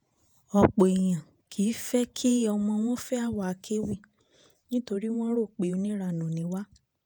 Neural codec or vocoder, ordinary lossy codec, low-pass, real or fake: vocoder, 48 kHz, 128 mel bands, Vocos; none; none; fake